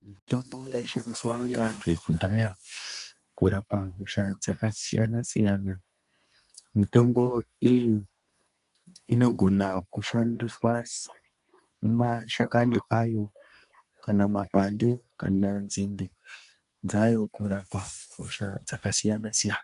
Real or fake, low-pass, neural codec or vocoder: fake; 10.8 kHz; codec, 24 kHz, 1 kbps, SNAC